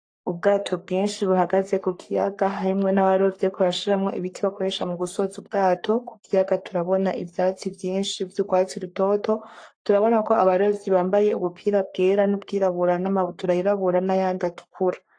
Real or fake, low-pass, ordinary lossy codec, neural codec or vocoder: fake; 9.9 kHz; AAC, 48 kbps; codec, 44.1 kHz, 3.4 kbps, Pupu-Codec